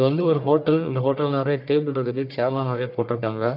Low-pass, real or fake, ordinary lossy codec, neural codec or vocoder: 5.4 kHz; fake; none; codec, 44.1 kHz, 1.7 kbps, Pupu-Codec